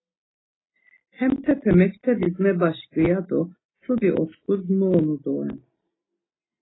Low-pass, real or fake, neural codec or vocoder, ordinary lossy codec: 7.2 kHz; real; none; AAC, 16 kbps